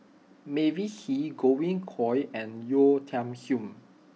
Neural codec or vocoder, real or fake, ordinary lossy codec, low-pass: none; real; none; none